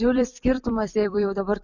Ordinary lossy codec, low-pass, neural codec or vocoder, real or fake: Opus, 64 kbps; 7.2 kHz; vocoder, 44.1 kHz, 128 mel bands every 256 samples, BigVGAN v2; fake